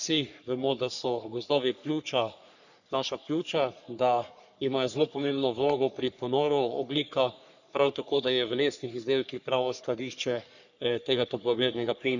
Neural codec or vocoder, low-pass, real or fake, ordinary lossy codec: codec, 44.1 kHz, 3.4 kbps, Pupu-Codec; 7.2 kHz; fake; none